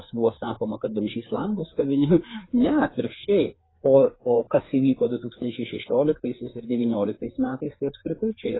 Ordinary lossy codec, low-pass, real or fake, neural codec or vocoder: AAC, 16 kbps; 7.2 kHz; fake; codec, 16 kHz, 4 kbps, FreqCodec, larger model